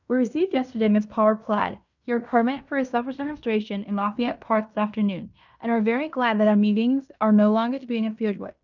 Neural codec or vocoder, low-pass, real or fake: codec, 16 kHz in and 24 kHz out, 0.9 kbps, LongCat-Audio-Codec, fine tuned four codebook decoder; 7.2 kHz; fake